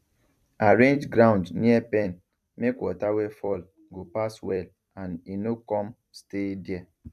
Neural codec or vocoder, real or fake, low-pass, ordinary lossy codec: vocoder, 44.1 kHz, 128 mel bands every 256 samples, BigVGAN v2; fake; 14.4 kHz; none